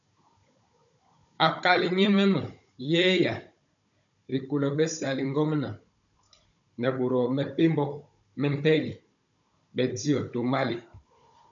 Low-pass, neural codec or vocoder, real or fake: 7.2 kHz; codec, 16 kHz, 16 kbps, FunCodec, trained on Chinese and English, 50 frames a second; fake